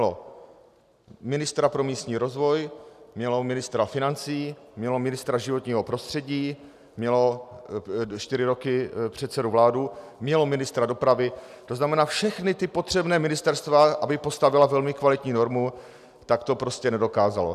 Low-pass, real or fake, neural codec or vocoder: 14.4 kHz; real; none